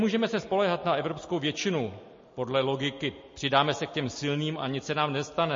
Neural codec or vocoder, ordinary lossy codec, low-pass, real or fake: none; MP3, 32 kbps; 7.2 kHz; real